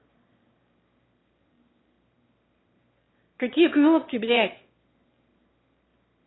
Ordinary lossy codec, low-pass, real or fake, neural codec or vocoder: AAC, 16 kbps; 7.2 kHz; fake; autoencoder, 22.05 kHz, a latent of 192 numbers a frame, VITS, trained on one speaker